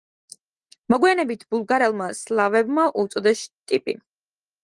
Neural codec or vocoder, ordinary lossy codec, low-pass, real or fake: none; Opus, 24 kbps; 10.8 kHz; real